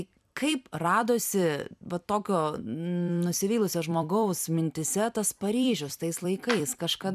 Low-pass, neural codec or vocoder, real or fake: 14.4 kHz; vocoder, 44.1 kHz, 128 mel bands every 256 samples, BigVGAN v2; fake